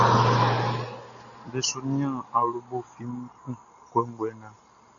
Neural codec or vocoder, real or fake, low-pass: none; real; 7.2 kHz